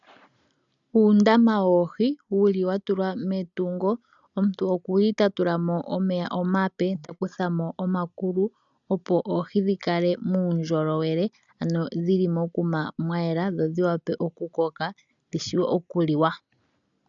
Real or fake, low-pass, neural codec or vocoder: real; 7.2 kHz; none